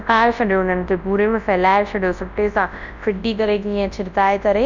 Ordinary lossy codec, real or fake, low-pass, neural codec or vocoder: none; fake; 7.2 kHz; codec, 24 kHz, 0.9 kbps, WavTokenizer, large speech release